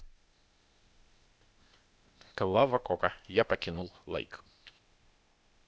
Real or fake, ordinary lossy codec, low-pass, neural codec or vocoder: fake; none; none; codec, 16 kHz, 0.8 kbps, ZipCodec